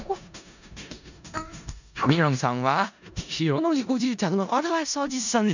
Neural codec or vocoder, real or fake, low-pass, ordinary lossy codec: codec, 16 kHz in and 24 kHz out, 0.4 kbps, LongCat-Audio-Codec, four codebook decoder; fake; 7.2 kHz; none